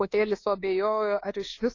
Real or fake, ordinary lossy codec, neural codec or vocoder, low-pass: fake; AAC, 32 kbps; codec, 24 kHz, 1.2 kbps, DualCodec; 7.2 kHz